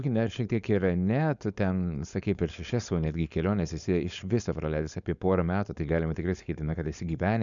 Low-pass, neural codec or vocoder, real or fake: 7.2 kHz; codec, 16 kHz, 4.8 kbps, FACodec; fake